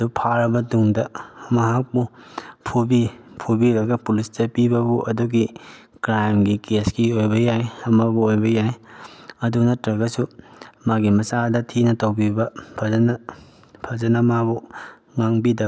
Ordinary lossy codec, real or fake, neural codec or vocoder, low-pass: none; real; none; none